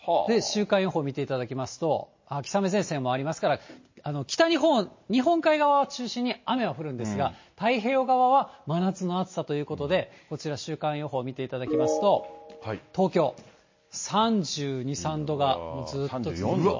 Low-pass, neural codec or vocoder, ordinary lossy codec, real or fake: 7.2 kHz; none; MP3, 32 kbps; real